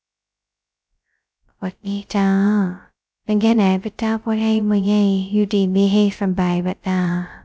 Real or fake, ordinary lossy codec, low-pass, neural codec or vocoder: fake; none; none; codec, 16 kHz, 0.2 kbps, FocalCodec